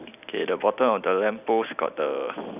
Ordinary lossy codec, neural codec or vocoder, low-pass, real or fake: none; none; 3.6 kHz; real